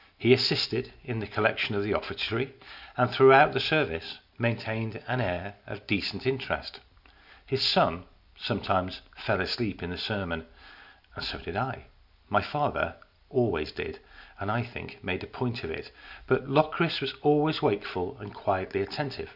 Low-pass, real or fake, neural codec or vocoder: 5.4 kHz; real; none